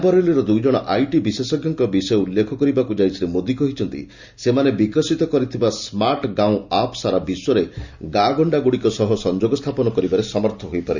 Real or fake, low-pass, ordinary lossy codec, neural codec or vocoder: real; 7.2 kHz; Opus, 64 kbps; none